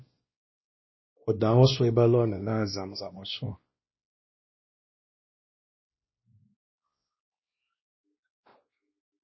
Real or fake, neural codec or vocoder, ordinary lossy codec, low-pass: fake; codec, 16 kHz, 1 kbps, X-Codec, WavLM features, trained on Multilingual LibriSpeech; MP3, 24 kbps; 7.2 kHz